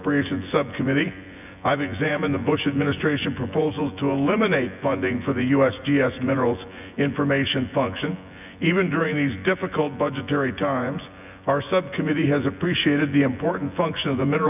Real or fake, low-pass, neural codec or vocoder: fake; 3.6 kHz; vocoder, 24 kHz, 100 mel bands, Vocos